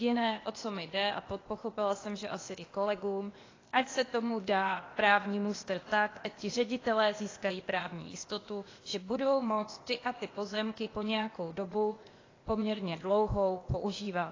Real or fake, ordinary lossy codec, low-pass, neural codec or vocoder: fake; AAC, 32 kbps; 7.2 kHz; codec, 16 kHz, 0.8 kbps, ZipCodec